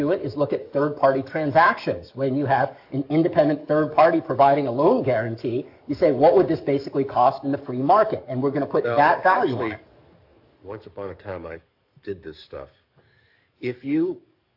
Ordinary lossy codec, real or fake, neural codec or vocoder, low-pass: AAC, 48 kbps; fake; codec, 44.1 kHz, 7.8 kbps, DAC; 5.4 kHz